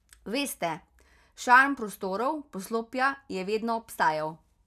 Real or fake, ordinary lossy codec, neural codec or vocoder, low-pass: real; none; none; 14.4 kHz